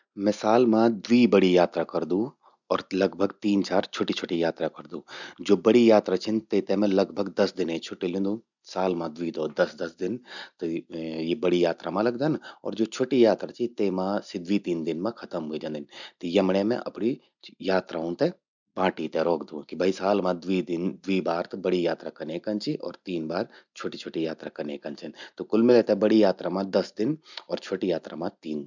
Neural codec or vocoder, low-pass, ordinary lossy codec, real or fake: none; 7.2 kHz; none; real